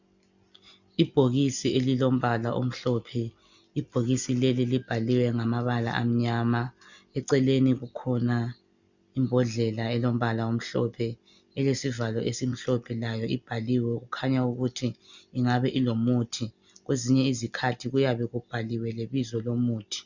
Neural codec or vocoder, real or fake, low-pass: none; real; 7.2 kHz